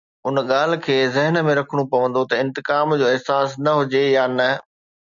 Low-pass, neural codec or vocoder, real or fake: 7.2 kHz; none; real